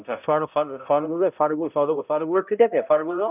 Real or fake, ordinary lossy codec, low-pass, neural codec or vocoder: fake; none; 3.6 kHz; codec, 16 kHz, 0.5 kbps, X-Codec, HuBERT features, trained on balanced general audio